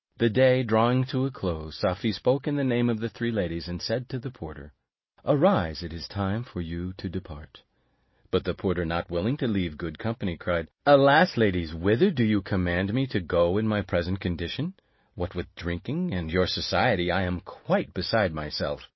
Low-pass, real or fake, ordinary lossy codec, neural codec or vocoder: 7.2 kHz; real; MP3, 24 kbps; none